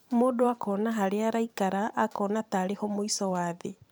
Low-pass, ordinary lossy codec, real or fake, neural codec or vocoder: none; none; fake; vocoder, 44.1 kHz, 128 mel bands every 512 samples, BigVGAN v2